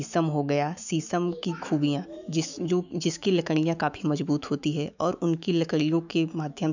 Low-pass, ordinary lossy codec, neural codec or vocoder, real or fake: 7.2 kHz; none; autoencoder, 48 kHz, 128 numbers a frame, DAC-VAE, trained on Japanese speech; fake